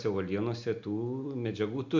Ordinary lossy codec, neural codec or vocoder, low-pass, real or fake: MP3, 64 kbps; none; 7.2 kHz; real